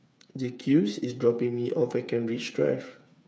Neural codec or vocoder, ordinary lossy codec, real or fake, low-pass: codec, 16 kHz, 8 kbps, FreqCodec, smaller model; none; fake; none